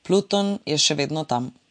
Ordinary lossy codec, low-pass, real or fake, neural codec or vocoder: MP3, 48 kbps; 9.9 kHz; real; none